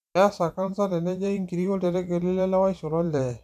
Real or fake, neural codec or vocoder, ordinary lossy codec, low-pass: fake; vocoder, 44.1 kHz, 128 mel bands every 512 samples, BigVGAN v2; none; 14.4 kHz